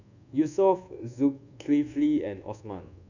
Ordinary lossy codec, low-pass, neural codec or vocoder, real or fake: none; 7.2 kHz; codec, 24 kHz, 1.2 kbps, DualCodec; fake